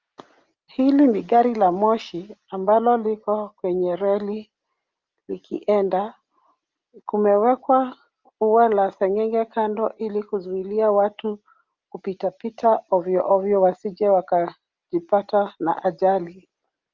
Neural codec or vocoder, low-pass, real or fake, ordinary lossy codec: none; 7.2 kHz; real; Opus, 24 kbps